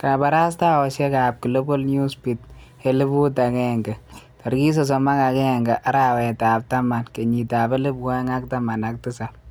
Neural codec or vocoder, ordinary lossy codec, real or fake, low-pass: none; none; real; none